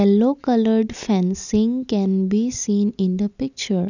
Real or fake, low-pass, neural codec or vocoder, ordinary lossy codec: real; 7.2 kHz; none; none